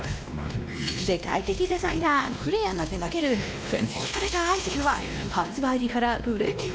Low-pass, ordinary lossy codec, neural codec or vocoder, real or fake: none; none; codec, 16 kHz, 1 kbps, X-Codec, WavLM features, trained on Multilingual LibriSpeech; fake